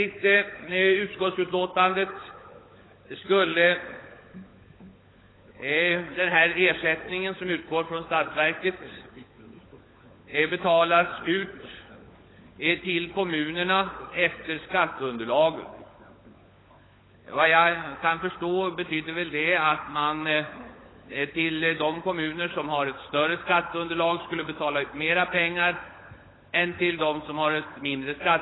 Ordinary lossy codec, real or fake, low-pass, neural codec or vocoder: AAC, 16 kbps; fake; 7.2 kHz; codec, 16 kHz, 16 kbps, FunCodec, trained on LibriTTS, 50 frames a second